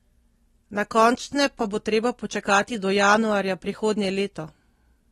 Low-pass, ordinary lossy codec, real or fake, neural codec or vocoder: 19.8 kHz; AAC, 32 kbps; real; none